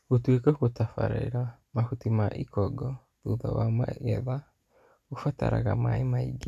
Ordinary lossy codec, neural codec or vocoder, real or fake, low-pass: none; none; real; 14.4 kHz